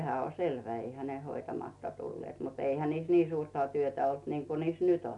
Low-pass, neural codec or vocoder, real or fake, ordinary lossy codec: 10.8 kHz; none; real; none